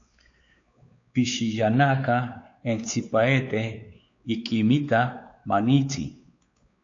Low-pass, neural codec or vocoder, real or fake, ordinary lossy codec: 7.2 kHz; codec, 16 kHz, 4 kbps, X-Codec, WavLM features, trained on Multilingual LibriSpeech; fake; AAC, 48 kbps